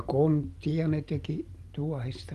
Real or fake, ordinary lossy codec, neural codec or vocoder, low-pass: real; Opus, 24 kbps; none; 14.4 kHz